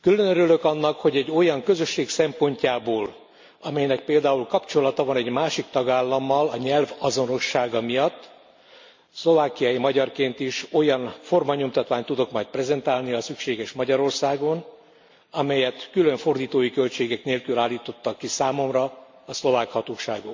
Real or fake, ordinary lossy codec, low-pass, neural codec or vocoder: real; MP3, 48 kbps; 7.2 kHz; none